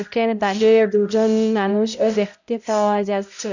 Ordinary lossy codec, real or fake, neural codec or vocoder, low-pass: none; fake; codec, 16 kHz, 0.5 kbps, X-Codec, HuBERT features, trained on balanced general audio; 7.2 kHz